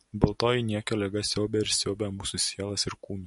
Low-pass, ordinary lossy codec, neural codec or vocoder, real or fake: 14.4 kHz; MP3, 48 kbps; none; real